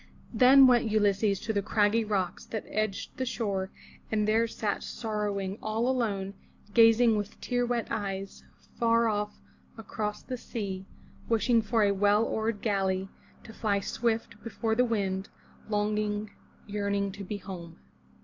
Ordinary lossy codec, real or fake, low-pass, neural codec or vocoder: AAC, 48 kbps; real; 7.2 kHz; none